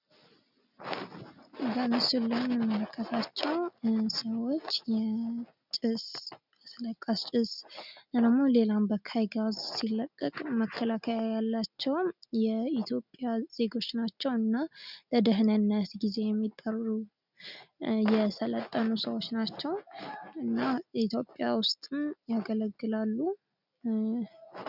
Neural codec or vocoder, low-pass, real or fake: none; 5.4 kHz; real